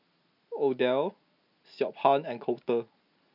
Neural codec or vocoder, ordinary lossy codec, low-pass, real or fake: none; none; 5.4 kHz; real